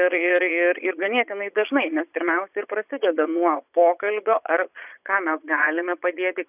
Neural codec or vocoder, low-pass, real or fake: vocoder, 22.05 kHz, 80 mel bands, Vocos; 3.6 kHz; fake